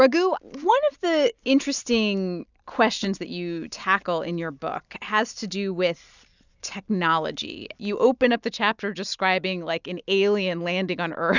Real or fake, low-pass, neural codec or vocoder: real; 7.2 kHz; none